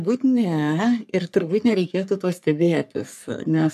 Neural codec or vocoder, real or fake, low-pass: codec, 44.1 kHz, 3.4 kbps, Pupu-Codec; fake; 14.4 kHz